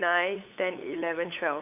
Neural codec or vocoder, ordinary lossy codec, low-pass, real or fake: codec, 16 kHz, 8 kbps, FunCodec, trained on LibriTTS, 25 frames a second; none; 3.6 kHz; fake